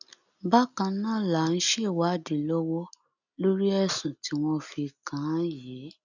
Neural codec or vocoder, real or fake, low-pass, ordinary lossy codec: none; real; 7.2 kHz; none